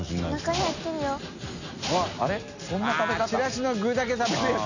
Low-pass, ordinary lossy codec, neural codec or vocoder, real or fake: 7.2 kHz; none; none; real